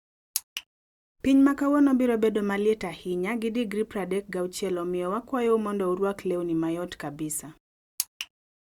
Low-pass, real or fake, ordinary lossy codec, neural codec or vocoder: 19.8 kHz; real; Opus, 64 kbps; none